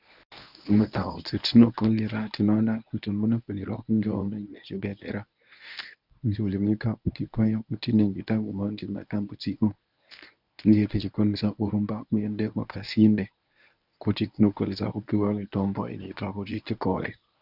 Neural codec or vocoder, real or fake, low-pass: codec, 24 kHz, 0.9 kbps, WavTokenizer, medium speech release version 1; fake; 5.4 kHz